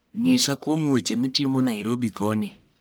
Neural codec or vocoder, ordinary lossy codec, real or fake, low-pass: codec, 44.1 kHz, 1.7 kbps, Pupu-Codec; none; fake; none